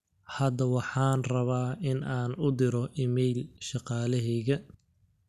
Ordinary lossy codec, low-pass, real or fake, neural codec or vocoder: none; 14.4 kHz; real; none